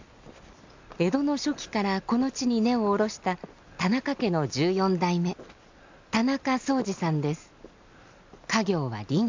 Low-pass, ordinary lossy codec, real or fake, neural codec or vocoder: 7.2 kHz; MP3, 64 kbps; real; none